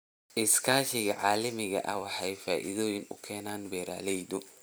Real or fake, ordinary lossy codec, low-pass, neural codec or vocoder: fake; none; none; vocoder, 44.1 kHz, 128 mel bands every 256 samples, BigVGAN v2